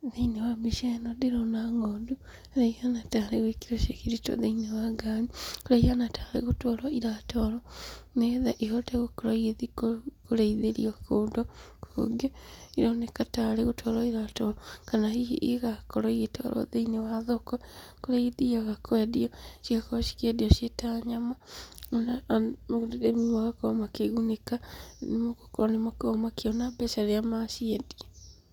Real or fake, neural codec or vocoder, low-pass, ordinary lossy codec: real; none; 19.8 kHz; none